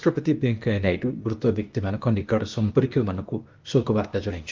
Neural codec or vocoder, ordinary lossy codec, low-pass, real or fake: codec, 16 kHz, 0.8 kbps, ZipCodec; Opus, 32 kbps; 7.2 kHz; fake